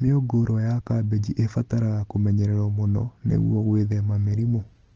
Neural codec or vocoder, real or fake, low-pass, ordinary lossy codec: none; real; 7.2 kHz; Opus, 16 kbps